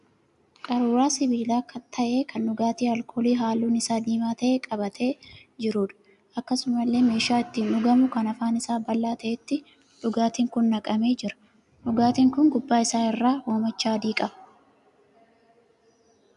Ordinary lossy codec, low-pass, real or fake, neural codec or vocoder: AAC, 96 kbps; 10.8 kHz; real; none